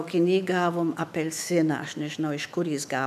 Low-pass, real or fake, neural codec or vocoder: 14.4 kHz; fake; autoencoder, 48 kHz, 128 numbers a frame, DAC-VAE, trained on Japanese speech